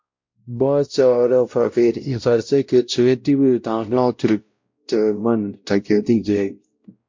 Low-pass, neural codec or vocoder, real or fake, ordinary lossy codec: 7.2 kHz; codec, 16 kHz, 0.5 kbps, X-Codec, WavLM features, trained on Multilingual LibriSpeech; fake; MP3, 48 kbps